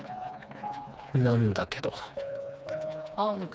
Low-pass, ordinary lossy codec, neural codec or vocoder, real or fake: none; none; codec, 16 kHz, 2 kbps, FreqCodec, smaller model; fake